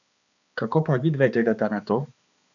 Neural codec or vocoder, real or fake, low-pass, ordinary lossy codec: codec, 16 kHz, 2 kbps, X-Codec, HuBERT features, trained on balanced general audio; fake; 7.2 kHz; none